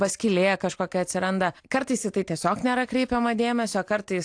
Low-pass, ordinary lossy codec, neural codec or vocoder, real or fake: 9.9 kHz; AAC, 64 kbps; none; real